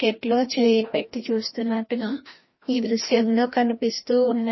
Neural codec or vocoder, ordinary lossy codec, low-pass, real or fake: codec, 16 kHz, 1 kbps, FreqCodec, larger model; MP3, 24 kbps; 7.2 kHz; fake